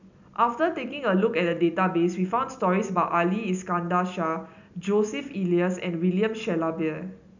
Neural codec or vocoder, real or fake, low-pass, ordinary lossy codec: none; real; 7.2 kHz; none